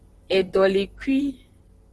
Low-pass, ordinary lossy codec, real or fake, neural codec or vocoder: 10.8 kHz; Opus, 16 kbps; fake; vocoder, 44.1 kHz, 128 mel bands, Pupu-Vocoder